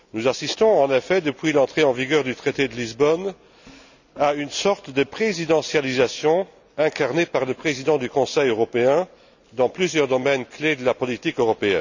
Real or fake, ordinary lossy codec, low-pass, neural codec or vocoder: real; none; 7.2 kHz; none